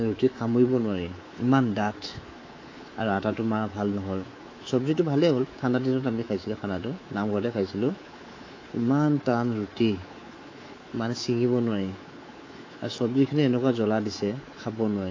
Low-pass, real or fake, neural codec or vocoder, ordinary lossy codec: 7.2 kHz; fake; codec, 24 kHz, 3.1 kbps, DualCodec; AAC, 32 kbps